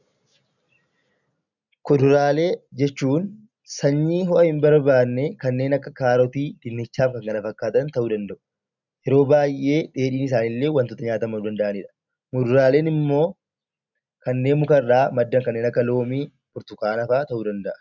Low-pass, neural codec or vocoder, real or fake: 7.2 kHz; none; real